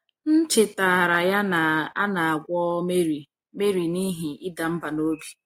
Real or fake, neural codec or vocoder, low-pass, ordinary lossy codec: real; none; 19.8 kHz; AAC, 48 kbps